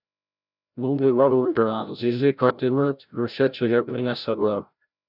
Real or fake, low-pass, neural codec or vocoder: fake; 5.4 kHz; codec, 16 kHz, 0.5 kbps, FreqCodec, larger model